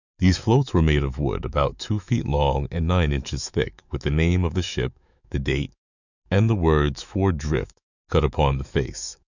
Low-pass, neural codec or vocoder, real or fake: 7.2 kHz; autoencoder, 48 kHz, 128 numbers a frame, DAC-VAE, trained on Japanese speech; fake